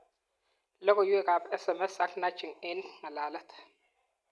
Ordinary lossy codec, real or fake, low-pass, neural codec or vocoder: none; real; none; none